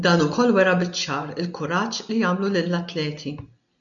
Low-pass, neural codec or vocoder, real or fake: 7.2 kHz; none; real